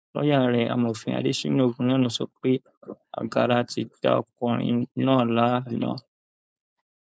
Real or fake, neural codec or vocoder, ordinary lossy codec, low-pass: fake; codec, 16 kHz, 4.8 kbps, FACodec; none; none